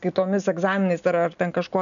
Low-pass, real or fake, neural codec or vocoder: 7.2 kHz; real; none